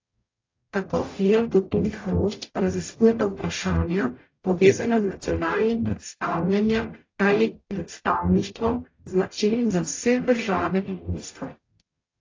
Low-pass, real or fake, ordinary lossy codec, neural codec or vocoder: 7.2 kHz; fake; AAC, 32 kbps; codec, 44.1 kHz, 0.9 kbps, DAC